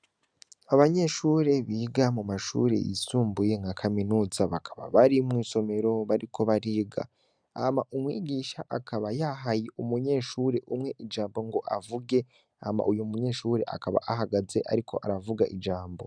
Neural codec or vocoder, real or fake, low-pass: none; real; 9.9 kHz